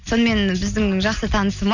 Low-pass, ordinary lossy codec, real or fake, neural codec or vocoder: 7.2 kHz; none; real; none